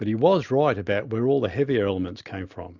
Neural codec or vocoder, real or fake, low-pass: none; real; 7.2 kHz